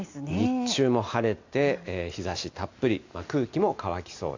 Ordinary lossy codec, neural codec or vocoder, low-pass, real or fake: AAC, 48 kbps; none; 7.2 kHz; real